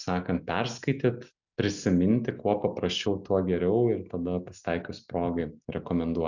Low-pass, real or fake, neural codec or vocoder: 7.2 kHz; real; none